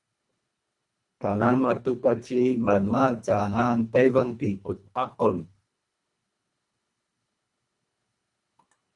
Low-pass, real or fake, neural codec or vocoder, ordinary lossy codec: 10.8 kHz; fake; codec, 24 kHz, 1.5 kbps, HILCodec; Opus, 64 kbps